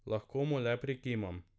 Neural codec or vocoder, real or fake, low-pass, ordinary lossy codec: none; real; none; none